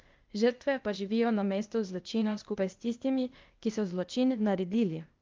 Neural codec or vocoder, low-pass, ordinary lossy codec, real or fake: codec, 16 kHz, 0.8 kbps, ZipCodec; 7.2 kHz; Opus, 24 kbps; fake